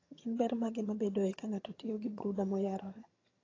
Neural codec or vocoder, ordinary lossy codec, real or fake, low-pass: vocoder, 22.05 kHz, 80 mel bands, HiFi-GAN; AAC, 32 kbps; fake; 7.2 kHz